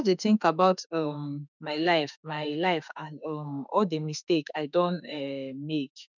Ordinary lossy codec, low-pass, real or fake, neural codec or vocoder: none; 7.2 kHz; fake; autoencoder, 48 kHz, 32 numbers a frame, DAC-VAE, trained on Japanese speech